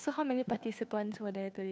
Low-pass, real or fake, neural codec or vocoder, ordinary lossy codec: none; fake; codec, 16 kHz, 2 kbps, FunCodec, trained on Chinese and English, 25 frames a second; none